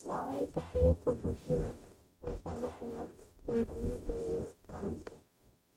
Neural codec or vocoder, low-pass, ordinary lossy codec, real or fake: codec, 44.1 kHz, 0.9 kbps, DAC; 19.8 kHz; MP3, 64 kbps; fake